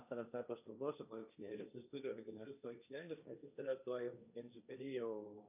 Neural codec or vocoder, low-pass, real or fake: codec, 16 kHz, 1.1 kbps, Voila-Tokenizer; 3.6 kHz; fake